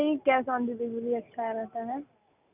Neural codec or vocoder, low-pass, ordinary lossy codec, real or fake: none; 3.6 kHz; none; real